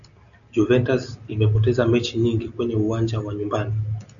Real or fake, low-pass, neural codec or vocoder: real; 7.2 kHz; none